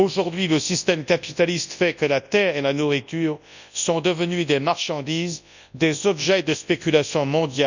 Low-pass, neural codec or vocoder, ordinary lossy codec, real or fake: 7.2 kHz; codec, 24 kHz, 0.9 kbps, WavTokenizer, large speech release; none; fake